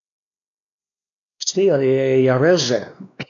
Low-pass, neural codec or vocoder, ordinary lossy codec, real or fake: 7.2 kHz; codec, 16 kHz, 2 kbps, X-Codec, WavLM features, trained on Multilingual LibriSpeech; none; fake